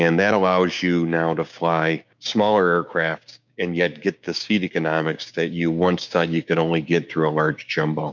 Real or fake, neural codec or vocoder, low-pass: fake; codec, 16 kHz, 6 kbps, DAC; 7.2 kHz